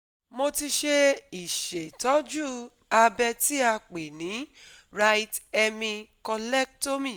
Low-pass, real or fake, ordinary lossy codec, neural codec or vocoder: none; real; none; none